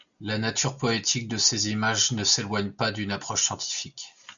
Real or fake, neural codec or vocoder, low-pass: real; none; 7.2 kHz